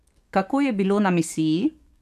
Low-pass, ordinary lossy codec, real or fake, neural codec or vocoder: 14.4 kHz; none; fake; codec, 44.1 kHz, 7.8 kbps, DAC